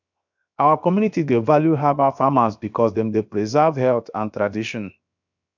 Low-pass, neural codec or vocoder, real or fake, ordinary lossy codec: 7.2 kHz; codec, 16 kHz, 0.7 kbps, FocalCodec; fake; none